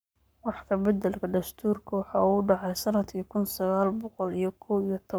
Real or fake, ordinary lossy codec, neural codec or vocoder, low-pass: fake; none; codec, 44.1 kHz, 7.8 kbps, Pupu-Codec; none